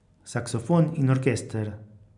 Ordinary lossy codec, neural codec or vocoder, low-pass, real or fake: none; none; 10.8 kHz; real